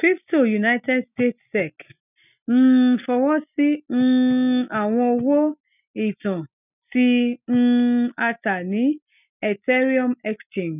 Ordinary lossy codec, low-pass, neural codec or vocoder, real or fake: none; 3.6 kHz; none; real